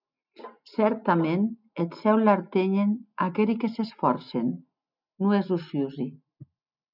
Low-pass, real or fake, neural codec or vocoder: 5.4 kHz; real; none